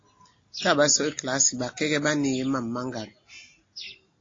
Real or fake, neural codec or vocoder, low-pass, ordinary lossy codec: real; none; 7.2 kHz; AAC, 32 kbps